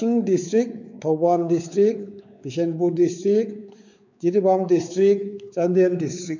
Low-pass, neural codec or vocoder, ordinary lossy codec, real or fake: 7.2 kHz; codec, 16 kHz, 4 kbps, X-Codec, WavLM features, trained on Multilingual LibriSpeech; none; fake